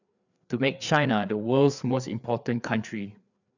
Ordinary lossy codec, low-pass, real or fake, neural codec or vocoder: none; 7.2 kHz; fake; codec, 16 kHz, 4 kbps, FreqCodec, larger model